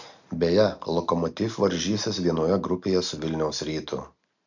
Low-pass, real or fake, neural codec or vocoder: 7.2 kHz; real; none